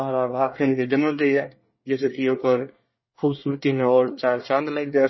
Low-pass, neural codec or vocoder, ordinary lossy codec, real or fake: 7.2 kHz; codec, 24 kHz, 1 kbps, SNAC; MP3, 24 kbps; fake